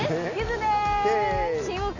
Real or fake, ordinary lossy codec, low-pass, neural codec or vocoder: real; none; 7.2 kHz; none